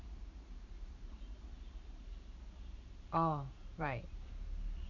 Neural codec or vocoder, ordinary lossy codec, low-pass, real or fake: vocoder, 44.1 kHz, 128 mel bands every 512 samples, BigVGAN v2; none; 7.2 kHz; fake